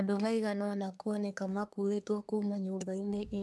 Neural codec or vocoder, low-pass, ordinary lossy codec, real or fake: codec, 24 kHz, 1 kbps, SNAC; none; none; fake